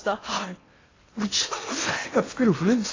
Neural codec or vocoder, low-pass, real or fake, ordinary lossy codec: codec, 16 kHz in and 24 kHz out, 0.8 kbps, FocalCodec, streaming, 65536 codes; 7.2 kHz; fake; AAC, 32 kbps